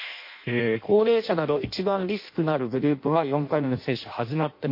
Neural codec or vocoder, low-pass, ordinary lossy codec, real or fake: codec, 16 kHz in and 24 kHz out, 0.6 kbps, FireRedTTS-2 codec; 5.4 kHz; MP3, 32 kbps; fake